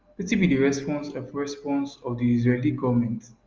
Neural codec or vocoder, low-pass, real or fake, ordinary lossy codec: none; 7.2 kHz; real; Opus, 24 kbps